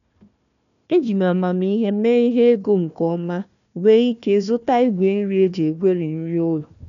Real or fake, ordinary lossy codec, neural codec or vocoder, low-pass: fake; none; codec, 16 kHz, 1 kbps, FunCodec, trained on Chinese and English, 50 frames a second; 7.2 kHz